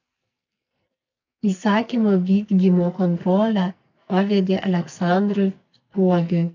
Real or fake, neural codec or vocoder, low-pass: fake; codec, 44.1 kHz, 2.6 kbps, SNAC; 7.2 kHz